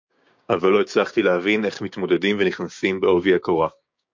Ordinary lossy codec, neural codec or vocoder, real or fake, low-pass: MP3, 48 kbps; codec, 16 kHz, 6 kbps, DAC; fake; 7.2 kHz